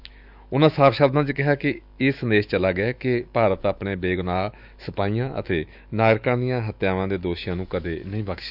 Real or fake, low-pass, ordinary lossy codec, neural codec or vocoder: fake; 5.4 kHz; none; autoencoder, 48 kHz, 128 numbers a frame, DAC-VAE, trained on Japanese speech